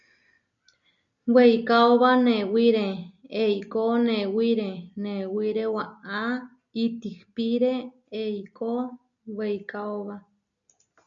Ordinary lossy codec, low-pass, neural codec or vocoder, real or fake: MP3, 64 kbps; 7.2 kHz; none; real